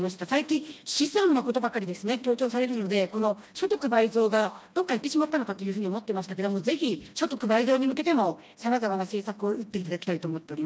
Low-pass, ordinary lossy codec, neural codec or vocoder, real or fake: none; none; codec, 16 kHz, 1 kbps, FreqCodec, smaller model; fake